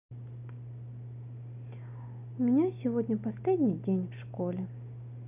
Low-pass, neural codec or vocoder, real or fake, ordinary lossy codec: 3.6 kHz; none; real; none